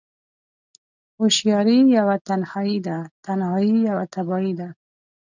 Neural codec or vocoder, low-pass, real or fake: none; 7.2 kHz; real